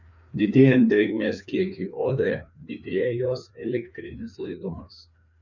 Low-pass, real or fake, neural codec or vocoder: 7.2 kHz; fake; codec, 16 kHz, 2 kbps, FreqCodec, larger model